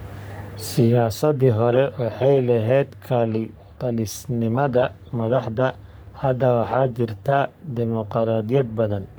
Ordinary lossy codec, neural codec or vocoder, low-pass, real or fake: none; codec, 44.1 kHz, 3.4 kbps, Pupu-Codec; none; fake